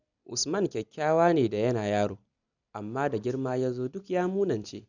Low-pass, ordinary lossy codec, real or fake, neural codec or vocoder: 7.2 kHz; none; real; none